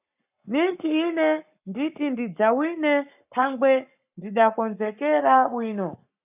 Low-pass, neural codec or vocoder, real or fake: 3.6 kHz; codec, 44.1 kHz, 7.8 kbps, Pupu-Codec; fake